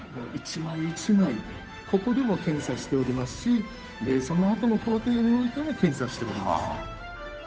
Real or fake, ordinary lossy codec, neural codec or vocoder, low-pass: fake; none; codec, 16 kHz, 8 kbps, FunCodec, trained on Chinese and English, 25 frames a second; none